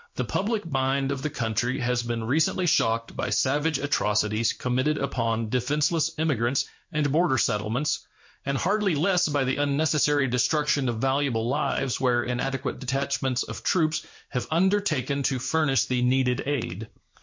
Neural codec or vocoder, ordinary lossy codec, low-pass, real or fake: codec, 16 kHz in and 24 kHz out, 1 kbps, XY-Tokenizer; MP3, 48 kbps; 7.2 kHz; fake